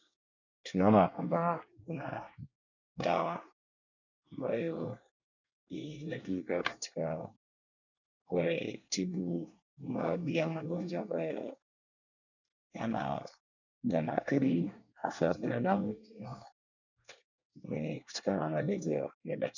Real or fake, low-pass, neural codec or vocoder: fake; 7.2 kHz; codec, 24 kHz, 1 kbps, SNAC